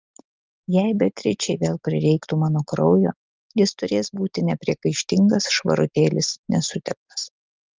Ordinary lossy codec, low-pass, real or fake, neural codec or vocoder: Opus, 32 kbps; 7.2 kHz; real; none